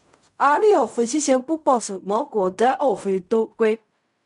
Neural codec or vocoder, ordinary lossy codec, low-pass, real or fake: codec, 16 kHz in and 24 kHz out, 0.4 kbps, LongCat-Audio-Codec, fine tuned four codebook decoder; none; 10.8 kHz; fake